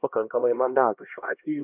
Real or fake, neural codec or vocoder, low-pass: fake; codec, 16 kHz, 1 kbps, X-Codec, HuBERT features, trained on LibriSpeech; 3.6 kHz